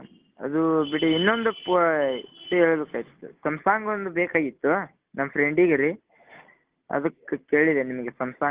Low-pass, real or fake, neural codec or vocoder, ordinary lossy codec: 3.6 kHz; real; none; Opus, 16 kbps